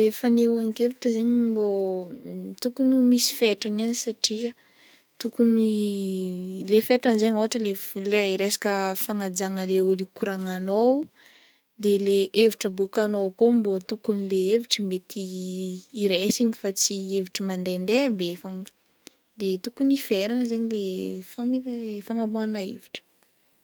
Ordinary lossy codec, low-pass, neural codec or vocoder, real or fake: none; none; codec, 44.1 kHz, 2.6 kbps, SNAC; fake